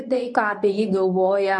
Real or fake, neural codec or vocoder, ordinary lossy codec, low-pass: fake; codec, 24 kHz, 0.9 kbps, WavTokenizer, medium speech release version 1; MP3, 64 kbps; 10.8 kHz